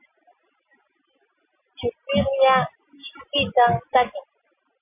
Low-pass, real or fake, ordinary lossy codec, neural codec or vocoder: 3.6 kHz; real; MP3, 32 kbps; none